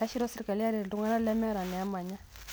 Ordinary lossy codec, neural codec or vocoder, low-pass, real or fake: none; none; none; real